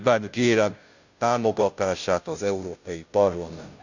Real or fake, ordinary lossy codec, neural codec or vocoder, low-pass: fake; none; codec, 16 kHz, 0.5 kbps, FunCodec, trained on Chinese and English, 25 frames a second; 7.2 kHz